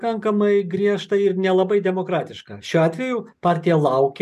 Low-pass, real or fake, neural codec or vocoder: 14.4 kHz; real; none